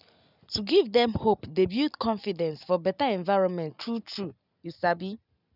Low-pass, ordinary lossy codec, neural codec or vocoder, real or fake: 5.4 kHz; none; none; real